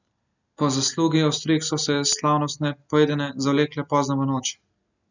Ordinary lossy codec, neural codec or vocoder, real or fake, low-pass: none; none; real; 7.2 kHz